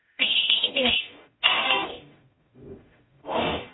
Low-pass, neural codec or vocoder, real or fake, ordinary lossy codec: 7.2 kHz; codec, 44.1 kHz, 0.9 kbps, DAC; fake; AAC, 16 kbps